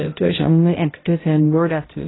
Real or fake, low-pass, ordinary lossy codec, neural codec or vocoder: fake; 7.2 kHz; AAC, 16 kbps; codec, 16 kHz, 0.5 kbps, X-Codec, HuBERT features, trained on balanced general audio